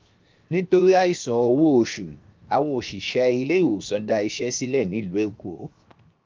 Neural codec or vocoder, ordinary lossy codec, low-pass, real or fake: codec, 16 kHz, 0.7 kbps, FocalCodec; Opus, 24 kbps; 7.2 kHz; fake